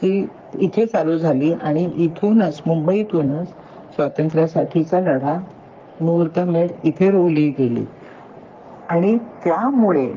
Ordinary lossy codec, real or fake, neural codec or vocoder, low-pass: Opus, 32 kbps; fake; codec, 44.1 kHz, 3.4 kbps, Pupu-Codec; 7.2 kHz